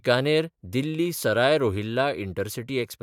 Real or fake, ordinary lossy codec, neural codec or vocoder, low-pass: fake; none; vocoder, 44.1 kHz, 128 mel bands every 512 samples, BigVGAN v2; 19.8 kHz